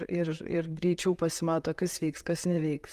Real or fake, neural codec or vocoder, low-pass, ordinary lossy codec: fake; vocoder, 44.1 kHz, 128 mel bands, Pupu-Vocoder; 14.4 kHz; Opus, 32 kbps